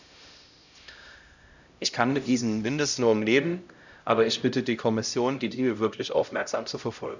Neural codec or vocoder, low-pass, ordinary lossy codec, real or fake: codec, 16 kHz, 0.5 kbps, X-Codec, HuBERT features, trained on LibriSpeech; 7.2 kHz; none; fake